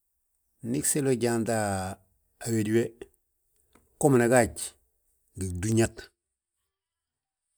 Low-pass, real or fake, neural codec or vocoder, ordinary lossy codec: none; real; none; none